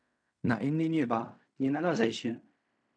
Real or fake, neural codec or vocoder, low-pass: fake; codec, 16 kHz in and 24 kHz out, 0.4 kbps, LongCat-Audio-Codec, fine tuned four codebook decoder; 9.9 kHz